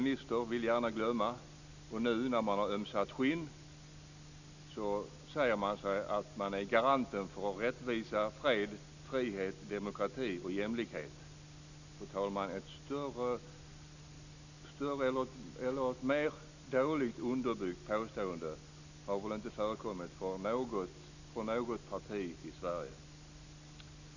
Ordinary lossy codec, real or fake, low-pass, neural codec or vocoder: none; real; 7.2 kHz; none